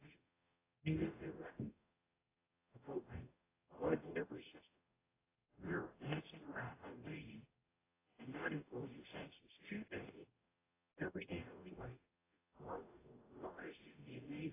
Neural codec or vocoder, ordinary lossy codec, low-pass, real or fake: codec, 44.1 kHz, 0.9 kbps, DAC; AAC, 16 kbps; 3.6 kHz; fake